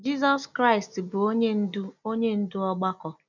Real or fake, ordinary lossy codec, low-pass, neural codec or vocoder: real; none; 7.2 kHz; none